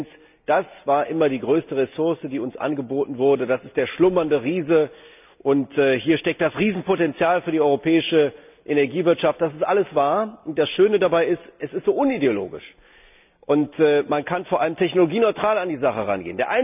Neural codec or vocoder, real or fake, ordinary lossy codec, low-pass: none; real; none; 3.6 kHz